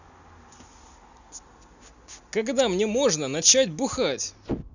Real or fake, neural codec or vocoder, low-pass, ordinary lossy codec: real; none; 7.2 kHz; none